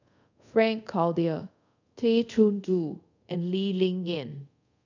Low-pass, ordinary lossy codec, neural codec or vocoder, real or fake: 7.2 kHz; none; codec, 24 kHz, 0.5 kbps, DualCodec; fake